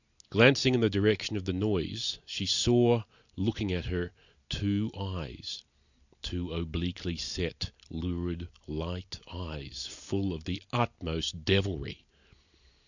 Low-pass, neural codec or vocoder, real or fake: 7.2 kHz; none; real